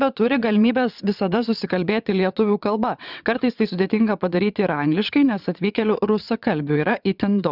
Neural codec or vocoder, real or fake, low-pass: vocoder, 22.05 kHz, 80 mel bands, WaveNeXt; fake; 5.4 kHz